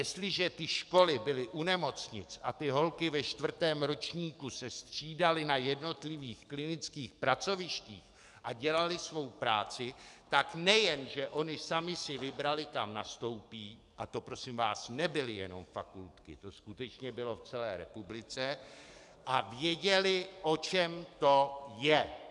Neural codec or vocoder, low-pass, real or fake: codec, 44.1 kHz, 7.8 kbps, DAC; 10.8 kHz; fake